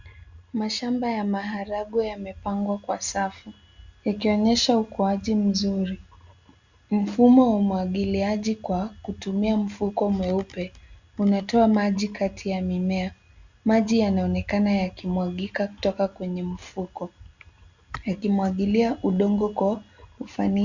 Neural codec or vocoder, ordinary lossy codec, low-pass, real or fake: none; Opus, 64 kbps; 7.2 kHz; real